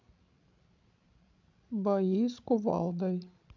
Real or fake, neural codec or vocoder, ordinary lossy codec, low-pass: fake; codec, 16 kHz, 16 kbps, FreqCodec, larger model; MP3, 64 kbps; 7.2 kHz